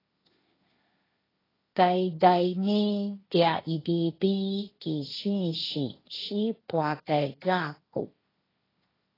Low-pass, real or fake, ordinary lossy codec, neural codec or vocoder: 5.4 kHz; fake; AAC, 24 kbps; codec, 16 kHz, 1.1 kbps, Voila-Tokenizer